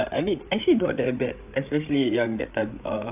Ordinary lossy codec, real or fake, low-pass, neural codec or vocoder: AAC, 32 kbps; fake; 3.6 kHz; codec, 16 kHz, 8 kbps, FreqCodec, larger model